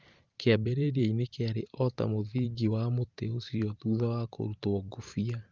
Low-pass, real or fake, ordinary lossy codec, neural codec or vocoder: 7.2 kHz; fake; Opus, 32 kbps; vocoder, 44.1 kHz, 128 mel bands every 512 samples, BigVGAN v2